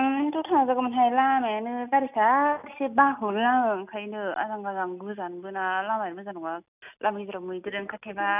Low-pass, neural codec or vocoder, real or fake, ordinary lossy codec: 3.6 kHz; none; real; none